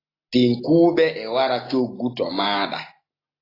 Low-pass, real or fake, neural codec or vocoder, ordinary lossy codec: 5.4 kHz; real; none; AAC, 24 kbps